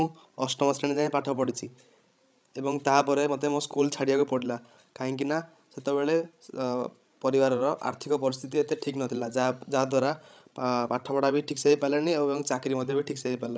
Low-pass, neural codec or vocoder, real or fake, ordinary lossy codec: none; codec, 16 kHz, 16 kbps, FreqCodec, larger model; fake; none